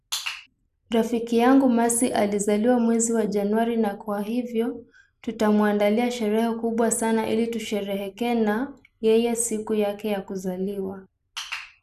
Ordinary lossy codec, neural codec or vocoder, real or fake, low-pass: none; none; real; 14.4 kHz